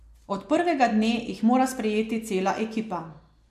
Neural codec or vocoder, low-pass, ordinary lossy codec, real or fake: none; 14.4 kHz; MP3, 64 kbps; real